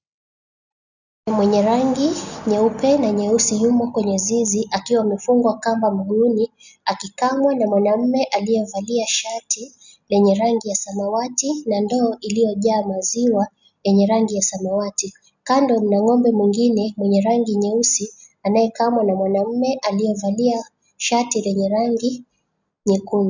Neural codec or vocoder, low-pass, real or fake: none; 7.2 kHz; real